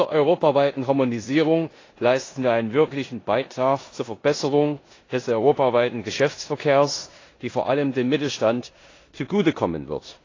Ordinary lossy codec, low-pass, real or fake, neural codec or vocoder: AAC, 32 kbps; 7.2 kHz; fake; codec, 16 kHz in and 24 kHz out, 0.9 kbps, LongCat-Audio-Codec, four codebook decoder